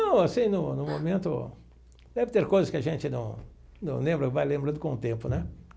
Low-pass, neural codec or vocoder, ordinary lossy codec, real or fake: none; none; none; real